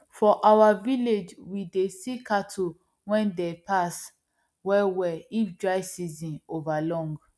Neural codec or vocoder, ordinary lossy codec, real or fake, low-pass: none; none; real; none